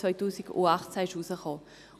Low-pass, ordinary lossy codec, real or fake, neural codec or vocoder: 14.4 kHz; none; real; none